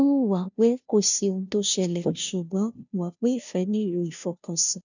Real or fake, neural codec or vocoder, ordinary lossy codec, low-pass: fake; codec, 16 kHz, 0.5 kbps, FunCodec, trained on Chinese and English, 25 frames a second; none; 7.2 kHz